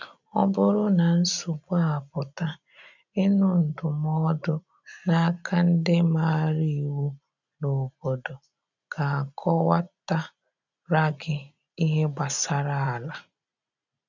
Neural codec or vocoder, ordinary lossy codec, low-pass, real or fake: none; none; 7.2 kHz; real